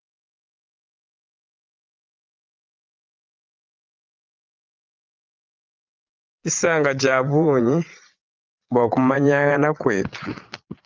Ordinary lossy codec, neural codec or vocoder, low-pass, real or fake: Opus, 32 kbps; vocoder, 22.05 kHz, 80 mel bands, Vocos; 7.2 kHz; fake